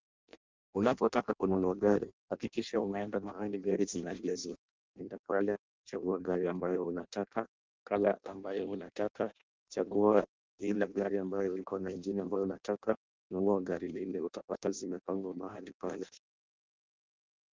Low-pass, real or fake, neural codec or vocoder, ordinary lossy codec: 7.2 kHz; fake; codec, 16 kHz in and 24 kHz out, 0.6 kbps, FireRedTTS-2 codec; Opus, 32 kbps